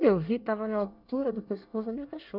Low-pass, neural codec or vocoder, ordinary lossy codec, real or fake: 5.4 kHz; codec, 24 kHz, 1 kbps, SNAC; none; fake